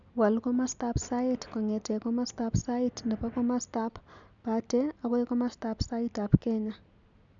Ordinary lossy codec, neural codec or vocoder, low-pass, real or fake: MP3, 96 kbps; none; 7.2 kHz; real